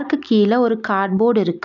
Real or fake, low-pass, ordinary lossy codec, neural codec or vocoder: real; 7.2 kHz; none; none